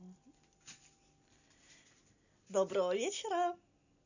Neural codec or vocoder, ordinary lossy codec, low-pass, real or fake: none; none; 7.2 kHz; real